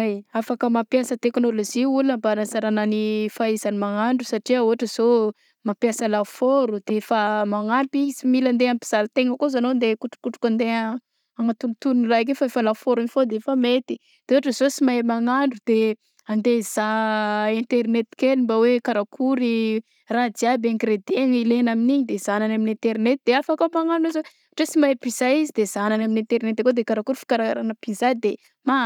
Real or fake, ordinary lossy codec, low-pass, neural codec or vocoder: real; none; 19.8 kHz; none